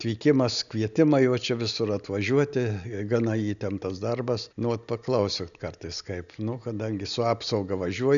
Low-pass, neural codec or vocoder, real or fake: 7.2 kHz; none; real